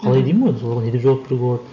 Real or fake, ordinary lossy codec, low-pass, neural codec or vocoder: real; none; 7.2 kHz; none